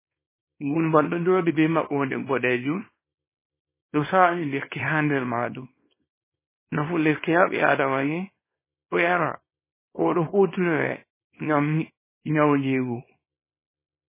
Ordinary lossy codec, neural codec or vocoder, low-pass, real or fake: MP3, 16 kbps; codec, 24 kHz, 0.9 kbps, WavTokenizer, small release; 3.6 kHz; fake